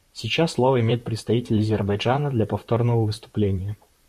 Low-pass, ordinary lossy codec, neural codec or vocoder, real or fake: 14.4 kHz; MP3, 64 kbps; vocoder, 44.1 kHz, 128 mel bands, Pupu-Vocoder; fake